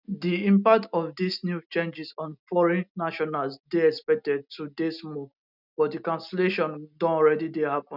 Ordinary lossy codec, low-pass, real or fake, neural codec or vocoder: none; 5.4 kHz; real; none